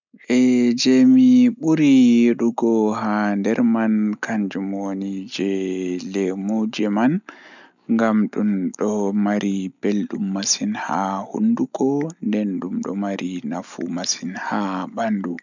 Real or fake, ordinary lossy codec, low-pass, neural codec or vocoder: real; none; 7.2 kHz; none